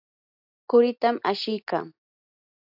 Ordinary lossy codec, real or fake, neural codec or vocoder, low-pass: AAC, 48 kbps; real; none; 5.4 kHz